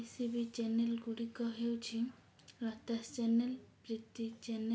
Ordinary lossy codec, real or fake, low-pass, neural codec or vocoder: none; real; none; none